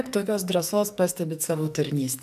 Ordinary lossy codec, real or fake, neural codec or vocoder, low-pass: MP3, 96 kbps; fake; codec, 44.1 kHz, 2.6 kbps, SNAC; 14.4 kHz